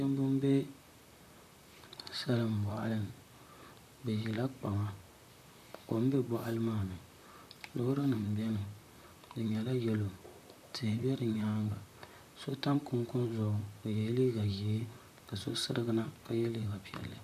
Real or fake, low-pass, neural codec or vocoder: fake; 14.4 kHz; vocoder, 44.1 kHz, 128 mel bands, Pupu-Vocoder